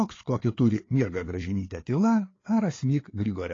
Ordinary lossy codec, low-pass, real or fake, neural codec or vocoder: AAC, 32 kbps; 7.2 kHz; fake; codec, 16 kHz, 4 kbps, FreqCodec, larger model